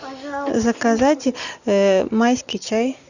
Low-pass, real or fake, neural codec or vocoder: 7.2 kHz; real; none